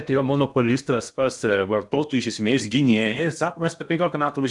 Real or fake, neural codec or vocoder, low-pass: fake; codec, 16 kHz in and 24 kHz out, 0.8 kbps, FocalCodec, streaming, 65536 codes; 10.8 kHz